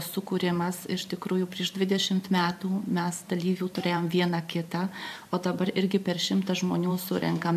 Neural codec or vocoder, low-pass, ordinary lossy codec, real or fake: vocoder, 44.1 kHz, 128 mel bands every 512 samples, BigVGAN v2; 14.4 kHz; AAC, 96 kbps; fake